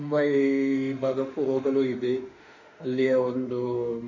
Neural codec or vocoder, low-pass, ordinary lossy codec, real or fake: autoencoder, 48 kHz, 32 numbers a frame, DAC-VAE, trained on Japanese speech; 7.2 kHz; none; fake